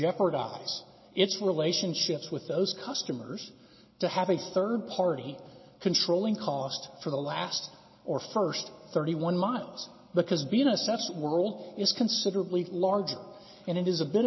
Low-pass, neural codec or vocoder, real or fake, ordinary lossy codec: 7.2 kHz; none; real; MP3, 24 kbps